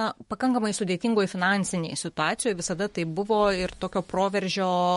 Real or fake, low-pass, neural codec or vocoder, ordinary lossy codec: fake; 19.8 kHz; autoencoder, 48 kHz, 128 numbers a frame, DAC-VAE, trained on Japanese speech; MP3, 48 kbps